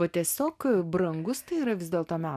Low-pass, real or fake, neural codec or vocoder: 14.4 kHz; real; none